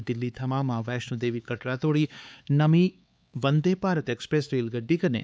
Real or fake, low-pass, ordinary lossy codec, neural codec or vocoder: fake; none; none; codec, 16 kHz, 4 kbps, X-Codec, HuBERT features, trained on LibriSpeech